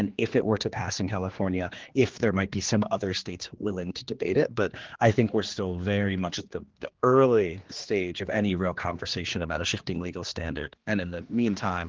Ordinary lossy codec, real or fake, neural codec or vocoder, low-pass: Opus, 16 kbps; fake; codec, 16 kHz, 2 kbps, X-Codec, HuBERT features, trained on general audio; 7.2 kHz